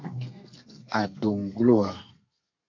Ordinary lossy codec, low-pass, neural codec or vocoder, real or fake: AAC, 48 kbps; 7.2 kHz; codec, 32 kHz, 1.9 kbps, SNAC; fake